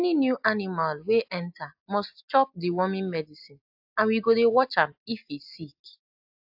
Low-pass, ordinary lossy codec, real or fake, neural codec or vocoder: 5.4 kHz; AAC, 48 kbps; real; none